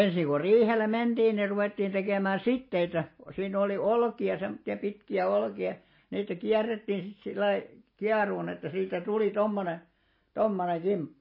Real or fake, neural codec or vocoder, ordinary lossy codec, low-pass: real; none; MP3, 24 kbps; 5.4 kHz